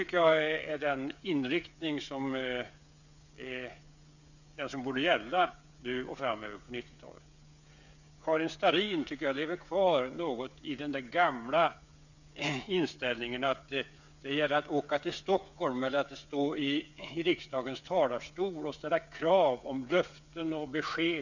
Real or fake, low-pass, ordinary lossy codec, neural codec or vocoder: fake; 7.2 kHz; none; codec, 16 kHz, 8 kbps, FreqCodec, smaller model